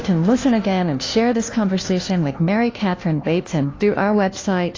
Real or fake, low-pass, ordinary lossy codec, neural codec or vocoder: fake; 7.2 kHz; AAC, 32 kbps; codec, 16 kHz, 1 kbps, FunCodec, trained on LibriTTS, 50 frames a second